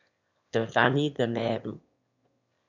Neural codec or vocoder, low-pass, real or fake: autoencoder, 22.05 kHz, a latent of 192 numbers a frame, VITS, trained on one speaker; 7.2 kHz; fake